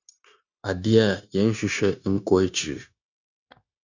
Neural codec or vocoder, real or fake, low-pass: codec, 16 kHz, 0.9 kbps, LongCat-Audio-Codec; fake; 7.2 kHz